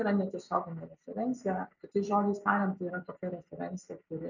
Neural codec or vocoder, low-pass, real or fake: none; 7.2 kHz; real